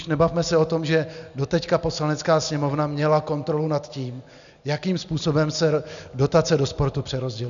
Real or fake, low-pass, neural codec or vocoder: real; 7.2 kHz; none